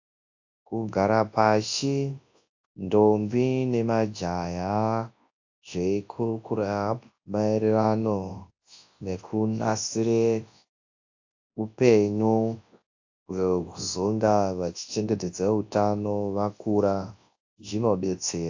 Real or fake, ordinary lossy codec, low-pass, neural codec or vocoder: fake; AAC, 48 kbps; 7.2 kHz; codec, 24 kHz, 0.9 kbps, WavTokenizer, large speech release